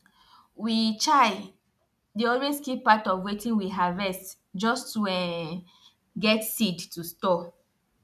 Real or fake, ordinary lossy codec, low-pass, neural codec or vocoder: real; none; 14.4 kHz; none